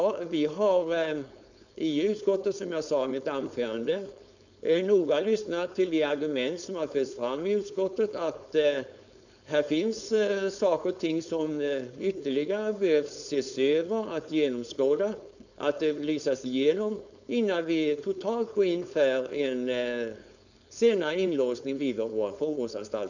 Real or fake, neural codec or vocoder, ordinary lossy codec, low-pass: fake; codec, 16 kHz, 4.8 kbps, FACodec; none; 7.2 kHz